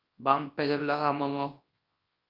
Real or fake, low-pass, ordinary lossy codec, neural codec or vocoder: fake; 5.4 kHz; Opus, 32 kbps; codec, 24 kHz, 0.9 kbps, WavTokenizer, large speech release